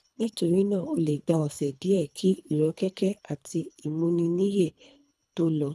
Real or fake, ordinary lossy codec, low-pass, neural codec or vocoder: fake; none; none; codec, 24 kHz, 3 kbps, HILCodec